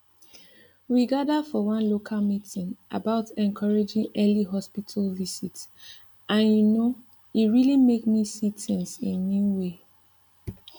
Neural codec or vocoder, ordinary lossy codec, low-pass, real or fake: none; none; 19.8 kHz; real